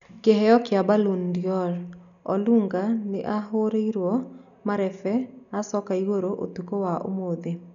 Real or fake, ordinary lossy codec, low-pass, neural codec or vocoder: real; none; 7.2 kHz; none